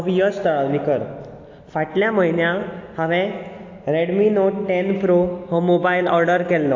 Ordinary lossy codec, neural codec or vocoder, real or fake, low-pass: AAC, 48 kbps; none; real; 7.2 kHz